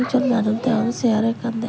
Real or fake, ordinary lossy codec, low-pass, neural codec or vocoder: real; none; none; none